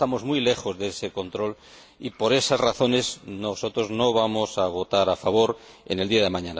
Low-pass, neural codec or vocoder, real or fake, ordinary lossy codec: none; none; real; none